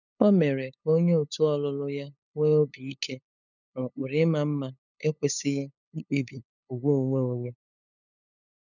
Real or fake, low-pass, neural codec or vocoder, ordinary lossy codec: fake; 7.2 kHz; codec, 16 kHz, 8 kbps, FunCodec, trained on LibriTTS, 25 frames a second; none